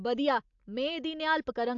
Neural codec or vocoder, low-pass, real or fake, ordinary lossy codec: none; 7.2 kHz; real; AAC, 64 kbps